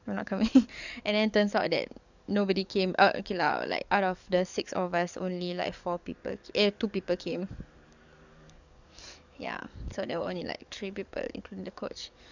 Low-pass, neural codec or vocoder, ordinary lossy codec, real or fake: 7.2 kHz; codec, 44.1 kHz, 7.8 kbps, DAC; none; fake